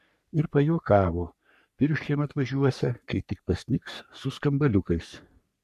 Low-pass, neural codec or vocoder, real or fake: 14.4 kHz; codec, 44.1 kHz, 3.4 kbps, Pupu-Codec; fake